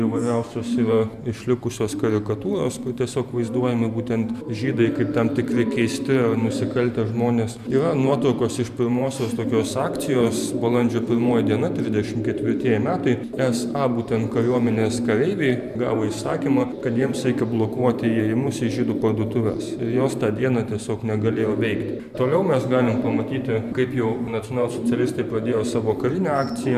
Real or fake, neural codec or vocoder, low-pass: fake; vocoder, 44.1 kHz, 128 mel bands every 512 samples, BigVGAN v2; 14.4 kHz